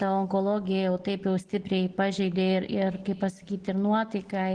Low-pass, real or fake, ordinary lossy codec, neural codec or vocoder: 9.9 kHz; real; Opus, 16 kbps; none